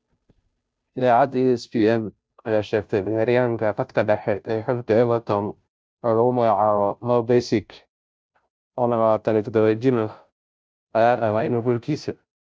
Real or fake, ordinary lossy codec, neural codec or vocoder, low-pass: fake; none; codec, 16 kHz, 0.5 kbps, FunCodec, trained on Chinese and English, 25 frames a second; none